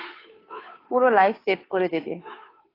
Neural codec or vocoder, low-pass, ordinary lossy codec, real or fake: codec, 16 kHz, 2 kbps, FunCodec, trained on Chinese and English, 25 frames a second; 5.4 kHz; AAC, 24 kbps; fake